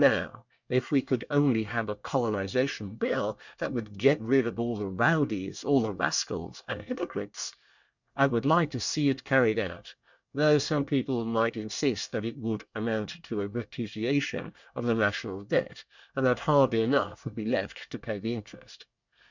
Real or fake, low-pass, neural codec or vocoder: fake; 7.2 kHz; codec, 24 kHz, 1 kbps, SNAC